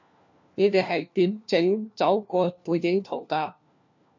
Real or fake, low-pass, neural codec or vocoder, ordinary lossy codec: fake; 7.2 kHz; codec, 16 kHz, 1 kbps, FunCodec, trained on LibriTTS, 50 frames a second; MP3, 48 kbps